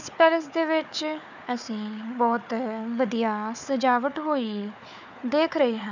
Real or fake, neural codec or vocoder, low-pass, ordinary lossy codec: fake; codec, 16 kHz, 4 kbps, FunCodec, trained on Chinese and English, 50 frames a second; 7.2 kHz; none